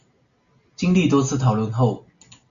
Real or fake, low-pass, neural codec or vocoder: real; 7.2 kHz; none